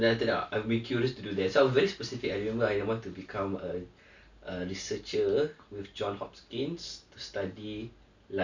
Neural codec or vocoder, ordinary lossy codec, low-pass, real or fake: none; none; 7.2 kHz; real